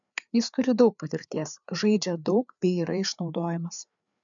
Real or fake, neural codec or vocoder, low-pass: fake; codec, 16 kHz, 4 kbps, FreqCodec, larger model; 7.2 kHz